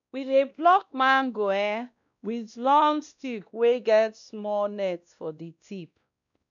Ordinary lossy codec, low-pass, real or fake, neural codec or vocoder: none; 7.2 kHz; fake; codec, 16 kHz, 1 kbps, X-Codec, WavLM features, trained on Multilingual LibriSpeech